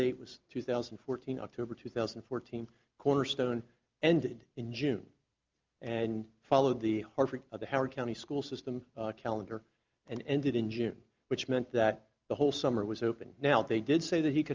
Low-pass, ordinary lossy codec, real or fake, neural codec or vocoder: 7.2 kHz; Opus, 32 kbps; real; none